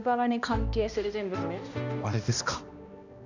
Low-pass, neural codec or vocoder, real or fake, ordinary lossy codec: 7.2 kHz; codec, 16 kHz, 1 kbps, X-Codec, HuBERT features, trained on balanced general audio; fake; none